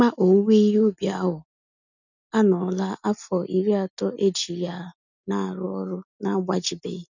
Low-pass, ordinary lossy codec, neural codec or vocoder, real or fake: 7.2 kHz; none; none; real